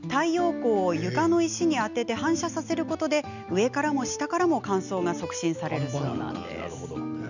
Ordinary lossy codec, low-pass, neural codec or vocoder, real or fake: none; 7.2 kHz; none; real